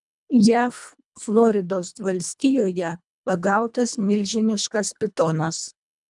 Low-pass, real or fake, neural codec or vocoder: 10.8 kHz; fake; codec, 24 kHz, 1.5 kbps, HILCodec